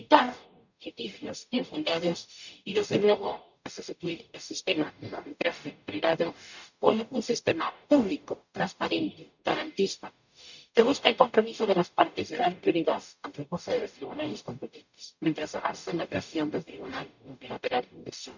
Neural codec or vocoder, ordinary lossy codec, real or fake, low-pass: codec, 44.1 kHz, 0.9 kbps, DAC; none; fake; 7.2 kHz